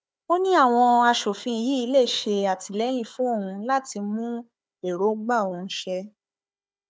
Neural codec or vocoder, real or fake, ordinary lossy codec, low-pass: codec, 16 kHz, 4 kbps, FunCodec, trained on Chinese and English, 50 frames a second; fake; none; none